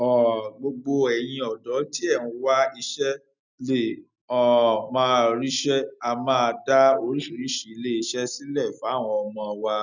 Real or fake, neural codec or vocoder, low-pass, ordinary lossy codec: real; none; 7.2 kHz; none